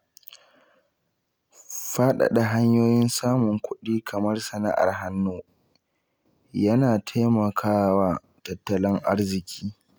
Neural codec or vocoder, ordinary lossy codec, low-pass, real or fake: none; none; none; real